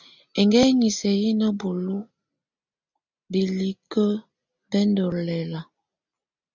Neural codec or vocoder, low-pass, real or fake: none; 7.2 kHz; real